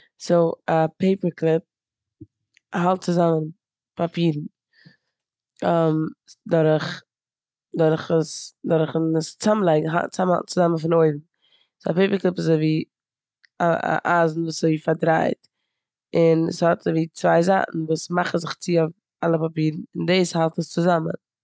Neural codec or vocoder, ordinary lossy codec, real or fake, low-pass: none; none; real; none